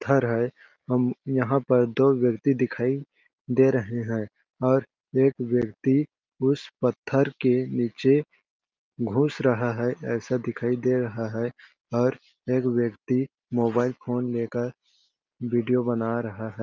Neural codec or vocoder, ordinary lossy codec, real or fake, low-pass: none; Opus, 24 kbps; real; 7.2 kHz